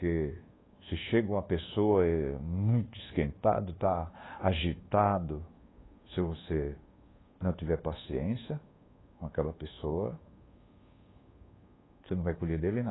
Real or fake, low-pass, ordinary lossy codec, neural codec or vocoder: fake; 7.2 kHz; AAC, 16 kbps; codec, 24 kHz, 1.2 kbps, DualCodec